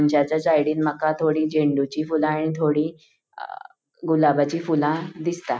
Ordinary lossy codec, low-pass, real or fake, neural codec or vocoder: none; none; real; none